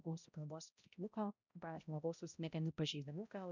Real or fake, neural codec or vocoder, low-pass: fake; codec, 16 kHz, 0.5 kbps, X-Codec, HuBERT features, trained on balanced general audio; 7.2 kHz